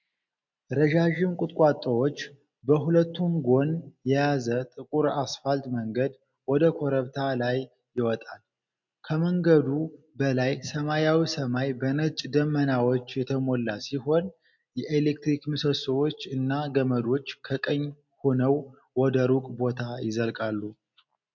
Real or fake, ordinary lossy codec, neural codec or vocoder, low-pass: real; MP3, 64 kbps; none; 7.2 kHz